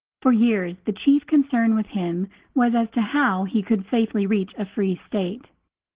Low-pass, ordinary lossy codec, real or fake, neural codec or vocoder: 3.6 kHz; Opus, 16 kbps; real; none